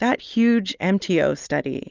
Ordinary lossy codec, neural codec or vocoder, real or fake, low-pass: Opus, 24 kbps; none; real; 7.2 kHz